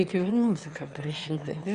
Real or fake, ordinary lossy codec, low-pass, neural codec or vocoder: fake; Opus, 64 kbps; 9.9 kHz; autoencoder, 22.05 kHz, a latent of 192 numbers a frame, VITS, trained on one speaker